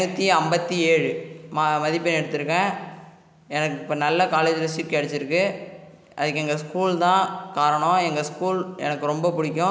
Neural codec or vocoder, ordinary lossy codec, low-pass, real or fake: none; none; none; real